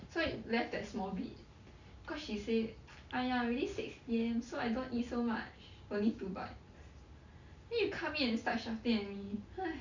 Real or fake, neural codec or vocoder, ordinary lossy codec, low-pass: real; none; Opus, 64 kbps; 7.2 kHz